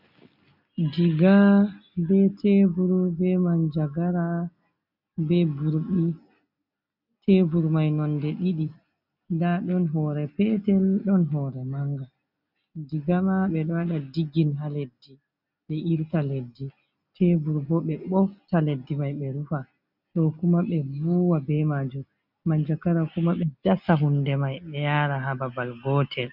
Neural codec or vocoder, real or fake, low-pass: none; real; 5.4 kHz